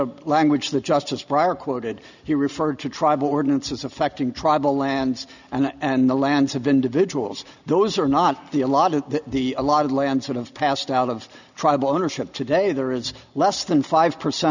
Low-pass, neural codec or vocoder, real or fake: 7.2 kHz; none; real